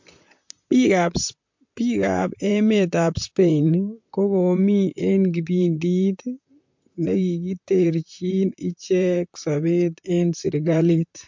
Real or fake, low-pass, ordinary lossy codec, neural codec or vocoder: fake; 7.2 kHz; MP3, 48 kbps; vocoder, 44.1 kHz, 128 mel bands, Pupu-Vocoder